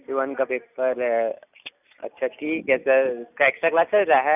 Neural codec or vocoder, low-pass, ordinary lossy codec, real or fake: none; 3.6 kHz; none; real